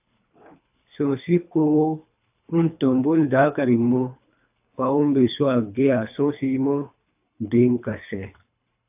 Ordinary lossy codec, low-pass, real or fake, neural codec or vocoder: AAC, 32 kbps; 3.6 kHz; fake; codec, 24 kHz, 3 kbps, HILCodec